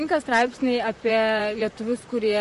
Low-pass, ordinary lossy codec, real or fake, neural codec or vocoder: 14.4 kHz; MP3, 48 kbps; fake; vocoder, 44.1 kHz, 128 mel bands, Pupu-Vocoder